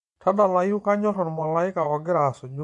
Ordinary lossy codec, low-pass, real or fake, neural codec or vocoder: MP3, 64 kbps; 10.8 kHz; fake; vocoder, 24 kHz, 100 mel bands, Vocos